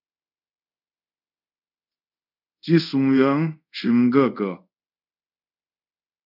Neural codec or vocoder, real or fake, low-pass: codec, 24 kHz, 0.5 kbps, DualCodec; fake; 5.4 kHz